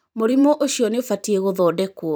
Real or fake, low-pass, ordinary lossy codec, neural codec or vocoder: fake; none; none; vocoder, 44.1 kHz, 128 mel bands every 512 samples, BigVGAN v2